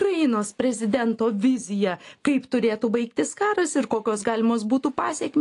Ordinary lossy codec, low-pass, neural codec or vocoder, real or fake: AAC, 48 kbps; 10.8 kHz; none; real